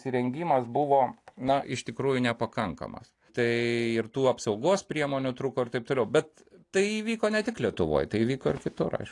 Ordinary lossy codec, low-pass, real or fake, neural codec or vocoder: AAC, 48 kbps; 10.8 kHz; real; none